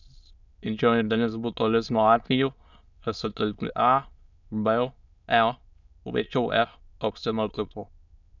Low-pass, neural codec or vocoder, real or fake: 7.2 kHz; autoencoder, 22.05 kHz, a latent of 192 numbers a frame, VITS, trained on many speakers; fake